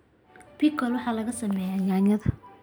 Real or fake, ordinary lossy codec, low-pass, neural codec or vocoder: real; none; none; none